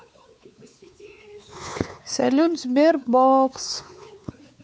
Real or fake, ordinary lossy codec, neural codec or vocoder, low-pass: fake; none; codec, 16 kHz, 4 kbps, X-Codec, WavLM features, trained on Multilingual LibriSpeech; none